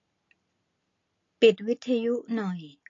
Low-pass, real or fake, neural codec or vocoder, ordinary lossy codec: 7.2 kHz; real; none; AAC, 32 kbps